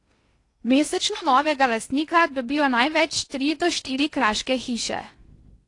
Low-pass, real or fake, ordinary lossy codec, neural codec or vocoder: 10.8 kHz; fake; AAC, 48 kbps; codec, 16 kHz in and 24 kHz out, 0.8 kbps, FocalCodec, streaming, 65536 codes